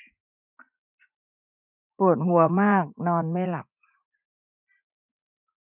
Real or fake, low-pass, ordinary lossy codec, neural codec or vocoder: fake; 3.6 kHz; none; vocoder, 44.1 kHz, 80 mel bands, Vocos